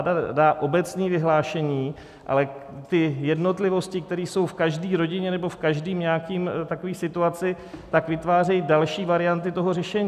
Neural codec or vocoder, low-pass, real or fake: none; 14.4 kHz; real